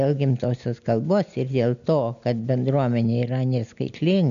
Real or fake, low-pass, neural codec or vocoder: real; 7.2 kHz; none